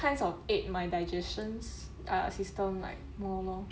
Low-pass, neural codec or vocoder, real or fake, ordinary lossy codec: none; none; real; none